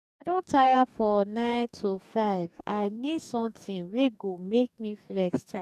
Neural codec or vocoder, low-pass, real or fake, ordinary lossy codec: codec, 44.1 kHz, 2.6 kbps, DAC; 14.4 kHz; fake; none